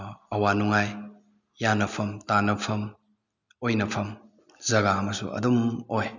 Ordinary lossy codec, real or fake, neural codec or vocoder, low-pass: none; real; none; 7.2 kHz